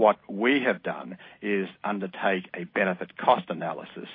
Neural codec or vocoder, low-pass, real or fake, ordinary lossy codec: none; 5.4 kHz; real; MP3, 24 kbps